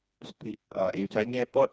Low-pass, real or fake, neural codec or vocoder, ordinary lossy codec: none; fake; codec, 16 kHz, 2 kbps, FreqCodec, smaller model; none